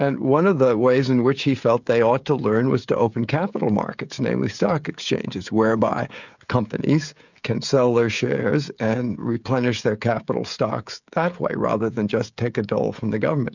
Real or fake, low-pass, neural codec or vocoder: fake; 7.2 kHz; codec, 16 kHz, 16 kbps, FreqCodec, smaller model